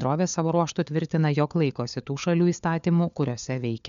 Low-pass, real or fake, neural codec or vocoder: 7.2 kHz; fake; codec, 16 kHz, 4 kbps, FunCodec, trained on LibriTTS, 50 frames a second